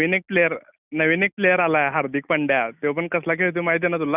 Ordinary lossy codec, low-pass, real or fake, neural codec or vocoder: none; 3.6 kHz; real; none